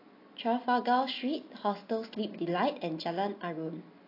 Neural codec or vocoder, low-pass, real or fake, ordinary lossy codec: none; 5.4 kHz; real; AAC, 32 kbps